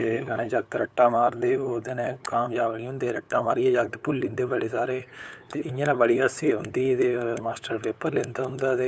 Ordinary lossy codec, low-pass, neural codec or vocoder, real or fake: none; none; codec, 16 kHz, 8 kbps, FunCodec, trained on LibriTTS, 25 frames a second; fake